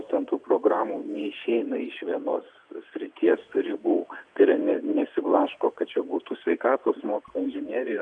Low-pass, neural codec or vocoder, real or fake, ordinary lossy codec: 9.9 kHz; vocoder, 22.05 kHz, 80 mel bands, WaveNeXt; fake; MP3, 96 kbps